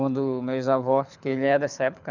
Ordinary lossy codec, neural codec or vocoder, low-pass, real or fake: none; codec, 24 kHz, 6 kbps, HILCodec; 7.2 kHz; fake